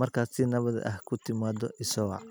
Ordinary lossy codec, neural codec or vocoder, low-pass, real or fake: none; none; none; real